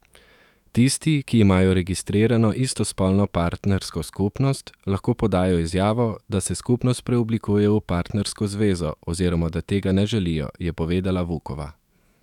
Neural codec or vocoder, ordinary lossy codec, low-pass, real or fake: autoencoder, 48 kHz, 128 numbers a frame, DAC-VAE, trained on Japanese speech; none; 19.8 kHz; fake